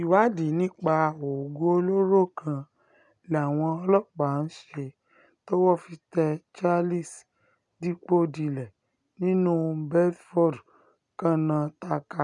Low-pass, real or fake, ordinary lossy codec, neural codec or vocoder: 10.8 kHz; real; none; none